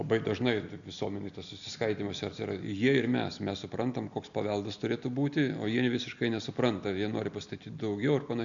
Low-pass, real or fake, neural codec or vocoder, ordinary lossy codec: 7.2 kHz; real; none; MP3, 96 kbps